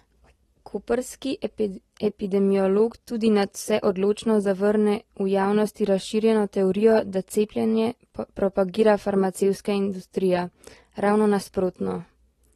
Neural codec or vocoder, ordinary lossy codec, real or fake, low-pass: none; AAC, 32 kbps; real; 19.8 kHz